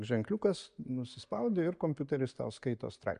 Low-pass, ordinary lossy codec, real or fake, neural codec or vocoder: 9.9 kHz; MP3, 64 kbps; real; none